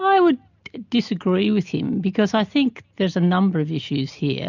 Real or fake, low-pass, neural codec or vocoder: real; 7.2 kHz; none